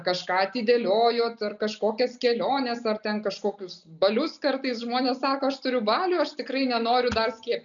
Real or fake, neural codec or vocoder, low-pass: real; none; 7.2 kHz